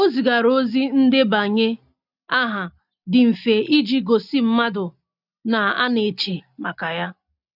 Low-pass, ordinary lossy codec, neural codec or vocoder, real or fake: 5.4 kHz; none; none; real